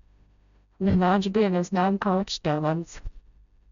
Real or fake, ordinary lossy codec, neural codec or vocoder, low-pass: fake; none; codec, 16 kHz, 0.5 kbps, FreqCodec, smaller model; 7.2 kHz